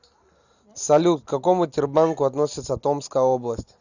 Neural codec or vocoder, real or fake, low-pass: none; real; 7.2 kHz